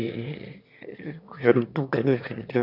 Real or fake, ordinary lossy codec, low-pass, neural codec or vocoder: fake; none; 5.4 kHz; autoencoder, 22.05 kHz, a latent of 192 numbers a frame, VITS, trained on one speaker